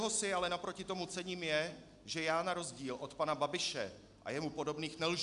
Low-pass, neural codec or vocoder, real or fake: 10.8 kHz; none; real